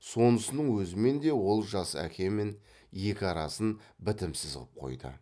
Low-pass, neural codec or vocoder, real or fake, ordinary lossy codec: none; none; real; none